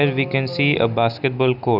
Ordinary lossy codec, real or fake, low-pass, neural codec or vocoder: none; real; 5.4 kHz; none